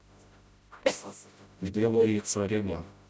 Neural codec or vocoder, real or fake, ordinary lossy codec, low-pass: codec, 16 kHz, 0.5 kbps, FreqCodec, smaller model; fake; none; none